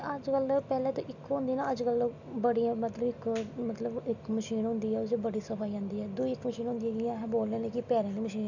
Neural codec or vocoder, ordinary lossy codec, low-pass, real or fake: none; none; 7.2 kHz; real